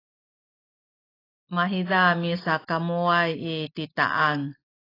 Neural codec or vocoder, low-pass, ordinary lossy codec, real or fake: none; 5.4 kHz; AAC, 24 kbps; real